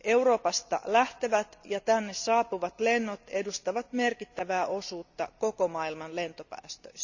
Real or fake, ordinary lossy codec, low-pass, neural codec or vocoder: real; none; 7.2 kHz; none